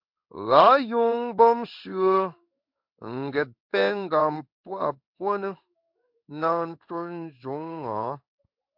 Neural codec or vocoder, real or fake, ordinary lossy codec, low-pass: codec, 16 kHz in and 24 kHz out, 1 kbps, XY-Tokenizer; fake; MP3, 48 kbps; 5.4 kHz